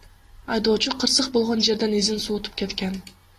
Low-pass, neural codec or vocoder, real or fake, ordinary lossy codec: 14.4 kHz; none; real; AAC, 48 kbps